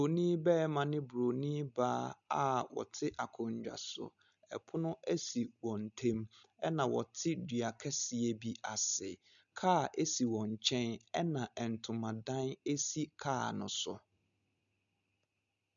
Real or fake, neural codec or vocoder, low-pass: real; none; 7.2 kHz